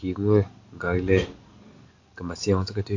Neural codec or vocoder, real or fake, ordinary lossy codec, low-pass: none; real; AAC, 48 kbps; 7.2 kHz